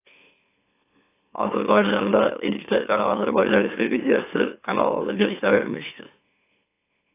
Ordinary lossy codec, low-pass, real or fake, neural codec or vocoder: AAC, 24 kbps; 3.6 kHz; fake; autoencoder, 44.1 kHz, a latent of 192 numbers a frame, MeloTTS